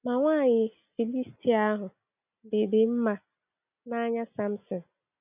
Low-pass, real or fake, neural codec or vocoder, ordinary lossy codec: 3.6 kHz; real; none; none